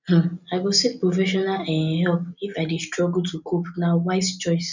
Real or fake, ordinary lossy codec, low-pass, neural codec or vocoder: real; none; 7.2 kHz; none